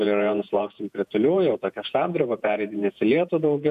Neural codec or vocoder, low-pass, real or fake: vocoder, 48 kHz, 128 mel bands, Vocos; 14.4 kHz; fake